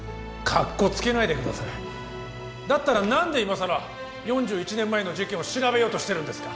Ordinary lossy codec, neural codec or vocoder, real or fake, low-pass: none; none; real; none